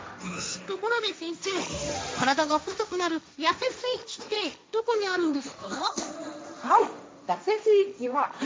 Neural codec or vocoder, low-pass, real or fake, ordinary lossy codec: codec, 16 kHz, 1.1 kbps, Voila-Tokenizer; none; fake; none